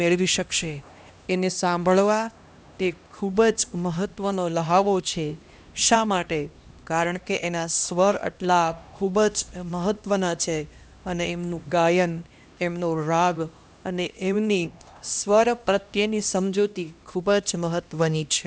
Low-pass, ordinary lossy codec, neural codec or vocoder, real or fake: none; none; codec, 16 kHz, 1 kbps, X-Codec, HuBERT features, trained on LibriSpeech; fake